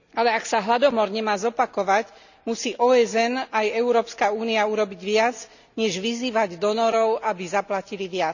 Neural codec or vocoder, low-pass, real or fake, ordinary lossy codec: none; 7.2 kHz; real; none